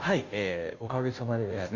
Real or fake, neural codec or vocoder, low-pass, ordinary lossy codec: fake; codec, 16 kHz, 0.5 kbps, FunCodec, trained on Chinese and English, 25 frames a second; 7.2 kHz; none